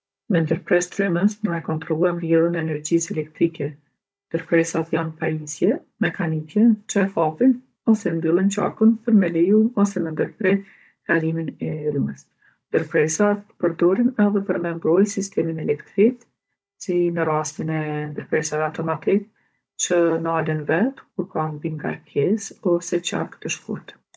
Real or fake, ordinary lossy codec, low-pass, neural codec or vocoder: fake; none; none; codec, 16 kHz, 16 kbps, FunCodec, trained on Chinese and English, 50 frames a second